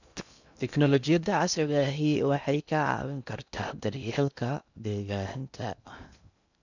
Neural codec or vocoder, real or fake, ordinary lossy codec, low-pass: codec, 16 kHz in and 24 kHz out, 0.6 kbps, FocalCodec, streaming, 2048 codes; fake; none; 7.2 kHz